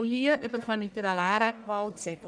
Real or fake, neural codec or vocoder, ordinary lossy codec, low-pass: fake; codec, 44.1 kHz, 1.7 kbps, Pupu-Codec; none; 9.9 kHz